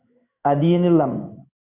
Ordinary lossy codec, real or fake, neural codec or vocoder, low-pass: Opus, 64 kbps; fake; codec, 16 kHz in and 24 kHz out, 1 kbps, XY-Tokenizer; 3.6 kHz